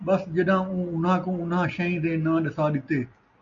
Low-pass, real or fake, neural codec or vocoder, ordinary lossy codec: 7.2 kHz; real; none; MP3, 96 kbps